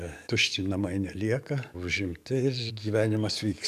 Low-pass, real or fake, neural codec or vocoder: 14.4 kHz; fake; codec, 44.1 kHz, 7.8 kbps, DAC